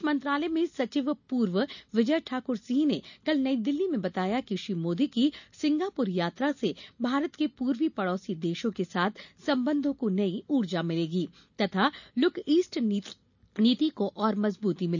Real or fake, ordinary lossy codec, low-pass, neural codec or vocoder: real; none; 7.2 kHz; none